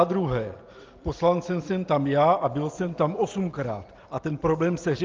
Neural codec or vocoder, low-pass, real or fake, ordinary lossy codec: none; 7.2 kHz; real; Opus, 24 kbps